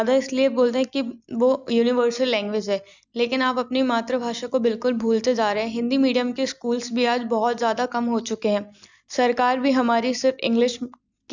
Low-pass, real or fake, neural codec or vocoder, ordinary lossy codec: 7.2 kHz; real; none; none